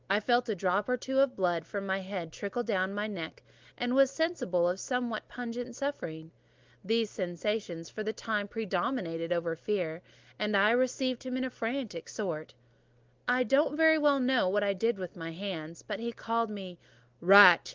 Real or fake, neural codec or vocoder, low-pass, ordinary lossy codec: real; none; 7.2 kHz; Opus, 32 kbps